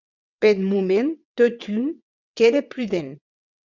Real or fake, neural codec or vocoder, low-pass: fake; vocoder, 22.05 kHz, 80 mel bands, WaveNeXt; 7.2 kHz